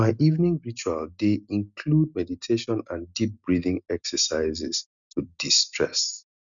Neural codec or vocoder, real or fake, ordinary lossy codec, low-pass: none; real; none; 7.2 kHz